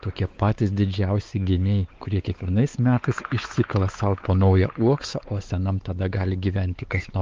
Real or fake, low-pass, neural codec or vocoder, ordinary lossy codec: fake; 7.2 kHz; codec, 16 kHz, 4 kbps, X-Codec, WavLM features, trained on Multilingual LibriSpeech; Opus, 32 kbps